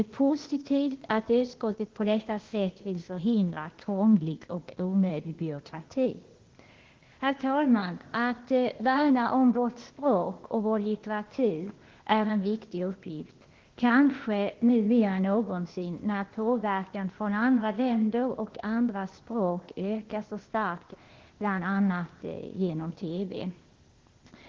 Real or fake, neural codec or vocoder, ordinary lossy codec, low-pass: fake; codec, 16 kHz, 0.8 kbps, ZipCodec; Opus, 16 kbps; 7.2 kHz